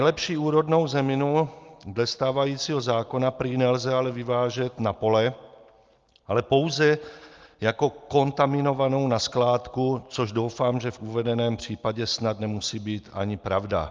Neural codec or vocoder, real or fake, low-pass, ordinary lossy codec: none; real; 7.2 kHz; Opus, 24 kbps